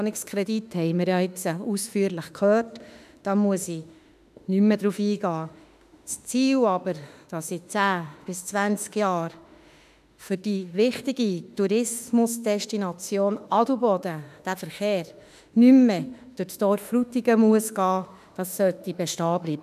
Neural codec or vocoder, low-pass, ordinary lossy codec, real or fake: autoencoder, 48 kHz, 32 numbers a frame, DAC-VAE, trained on Japanese speech; 14.4 kHz; none; fake